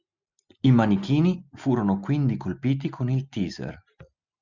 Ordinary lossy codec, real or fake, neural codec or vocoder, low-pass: Opus, 64 kbps; real; none; 7.2 kHz